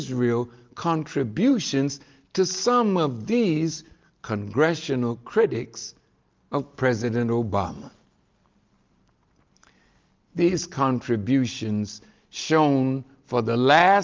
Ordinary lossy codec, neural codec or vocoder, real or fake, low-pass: Opus, 24 kbps; none; real; 7.2 kHz